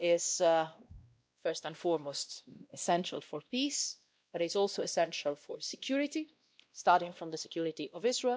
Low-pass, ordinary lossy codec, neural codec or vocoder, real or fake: none; none; codec, 16 kHz, 1 kbps, X-Codec, WavLM features, trained on Multilingual LibriSpeech; fake